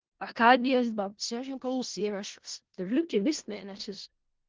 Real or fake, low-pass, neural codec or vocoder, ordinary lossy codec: fake; 7.2 kHz; codec, 16 kHz in and 24 kHz out, 0.4 kbps, LongCat-Audio-Codec, four codebook decoder; Opus, 16 kbps